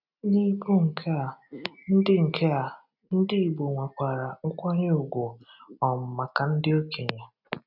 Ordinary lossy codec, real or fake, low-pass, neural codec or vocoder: none; real; 5.4 kHz; none